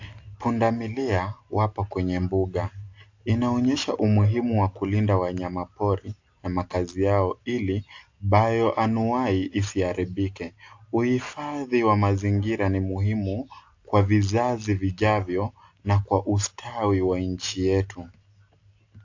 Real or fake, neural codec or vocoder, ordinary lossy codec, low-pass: real; none; AAC, 48 kbps; 7.2 kHz